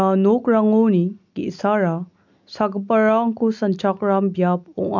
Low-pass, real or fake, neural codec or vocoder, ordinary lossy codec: 7.2 kHz; real; none; none